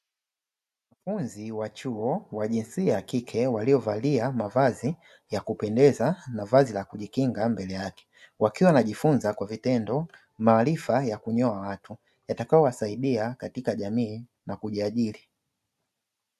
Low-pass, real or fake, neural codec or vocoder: 14.4 kHz; real; none